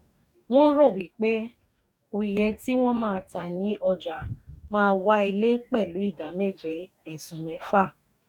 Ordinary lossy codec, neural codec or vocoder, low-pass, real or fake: none; codec, 44.1 kHz, 2.6 kbps, DAC; 19.8 kHz; fake